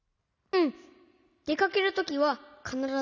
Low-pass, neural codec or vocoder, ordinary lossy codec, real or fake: 7.2 kHz; none; none; real